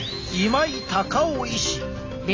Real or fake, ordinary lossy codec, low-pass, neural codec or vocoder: real; AAC, 32 kbps; 7.2 kHz; none